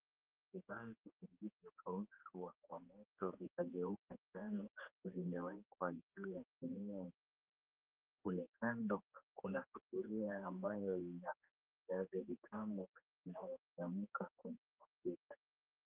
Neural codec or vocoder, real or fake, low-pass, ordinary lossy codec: codec, 16 kHz, 2 kbps, X-Codec, HuBERT features, trained on general audio; fake; 3.6 kHz; Opus, 32 kbps